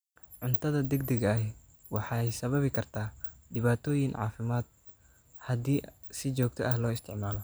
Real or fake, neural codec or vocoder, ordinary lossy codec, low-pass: real; none; none; none